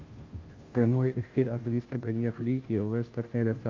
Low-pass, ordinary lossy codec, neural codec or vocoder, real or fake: 7.2 kHz; Opus, 32 kbps; codec, 16 kHz, 0.5 kbps, FunCodec, trained on Chinese and English, 25 frames a second; fake